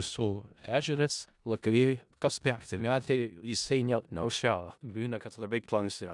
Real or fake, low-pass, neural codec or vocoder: fake; 10.8 kHz; codec, 16 kHz in and 24 kHz out, 0.4 kbps, LongCat-Audio-Codec, four codebook decoder